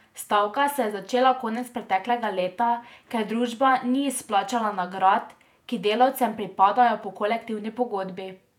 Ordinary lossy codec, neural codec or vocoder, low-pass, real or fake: none; vocoder, 48 kHz, 128 mel bands, Vocos; 19.8 kHz; fake